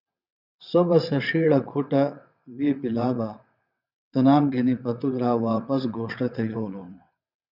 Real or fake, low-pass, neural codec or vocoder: fake; 5.4 kHz; vocoder, 22.05 kHz, 80 mel bands, WaveNeXt